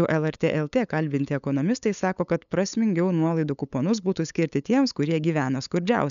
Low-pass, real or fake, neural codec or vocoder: 7.2 kHz; fake; codec, 16 kHz, 8 kbps, FunCodec, trained on LibriTTS, 25 frames a second